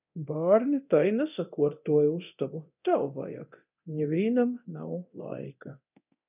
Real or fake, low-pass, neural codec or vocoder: fake; 3.6 kHz; codec, 24 kHz, 0.9 kbps, DualCodec